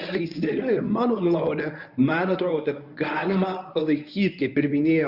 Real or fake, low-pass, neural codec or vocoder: fake; 5.4 kHz; codec, 24 kHz, 0.9 kbps, WavTokenizer, medium speech release version 1